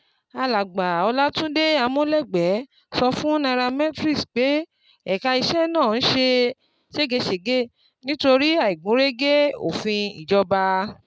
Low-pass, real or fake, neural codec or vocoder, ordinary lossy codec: none; real; none; none